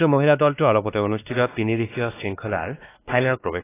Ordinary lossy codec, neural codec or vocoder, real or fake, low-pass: AAC, 16 kbps; codec, 16 kHz, 2 kbps, X-Codec, WavLM features, trained on Multilingual LibriSpeech; fake; 3.6 kHz